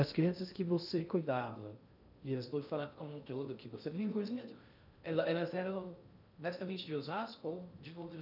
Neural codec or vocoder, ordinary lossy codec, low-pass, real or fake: codec, 16 kHz in and 24 kHz out, 0.6 kbps, FocalCodec, streaming, 2048 codes; none; 5.4 kHz; fake